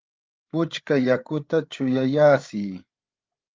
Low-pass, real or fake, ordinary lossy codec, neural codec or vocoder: 7.2 kHz; fake; Opus, 24 kbps; codec, 16 kHz, 8 kbps, FreqCodec, larger model